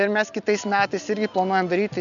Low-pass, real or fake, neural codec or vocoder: 7.2 kHz; real; none